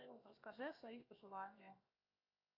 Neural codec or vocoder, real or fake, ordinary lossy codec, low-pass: codec, 16 kHz, 0.7 kbps, FocalCodec; fake; AAC, 24 kbps; 5.4 kHz